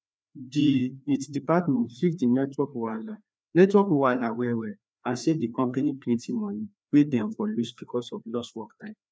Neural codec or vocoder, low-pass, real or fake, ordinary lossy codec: codec, 16 kHz, 2 kbps, FreqCodec, larger model; none; fake; none